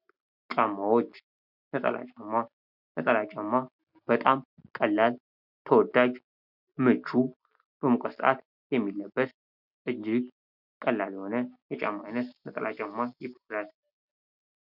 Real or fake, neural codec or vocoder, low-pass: real; none; 5.4 kHz